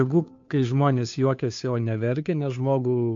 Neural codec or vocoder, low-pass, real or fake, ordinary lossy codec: codec, 16 kHz, 2 kbps, FunCodec, trained on Chinese and English, 25 frames a second; 7.2 kHz; fake; MP3, 48 kbps